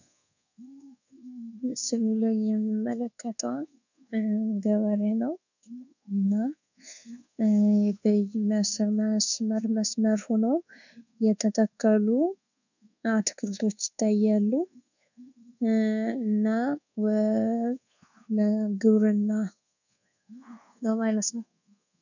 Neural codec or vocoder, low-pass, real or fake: codec, 24 kHz, 1.2 kbps, DualCodec; 7.2 kHz; fake